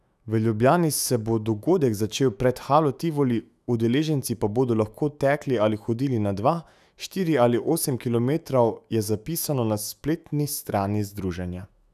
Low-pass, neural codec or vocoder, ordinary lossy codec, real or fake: 14.4 kHz; autoencoder, 48 kHz, 128 numbers a frame, DAC-VAE, trained on Japanese speech; none; fake